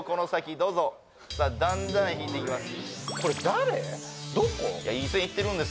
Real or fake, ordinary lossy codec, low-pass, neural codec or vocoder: real; none; none; none